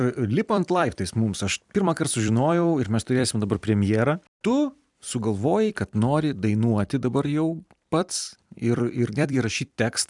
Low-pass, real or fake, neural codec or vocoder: 10.8 kHz; fake; vocoder, 44.1 kHz, 128 mel bands every 256 samples, BigVGAN v2